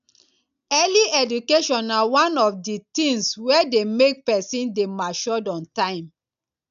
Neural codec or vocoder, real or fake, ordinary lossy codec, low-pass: none; real; none; 7.2 kHz